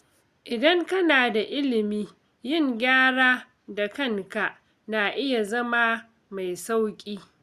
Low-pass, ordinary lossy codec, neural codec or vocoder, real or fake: 14.4 kHz; Opus, 64 kbps; none; real